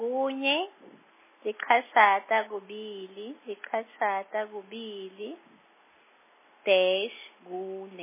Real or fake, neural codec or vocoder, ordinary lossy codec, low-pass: real; none; MP3, 16 kbps; 3.6 kHz